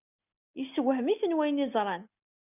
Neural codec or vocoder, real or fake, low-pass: none; real; 3.6 kHz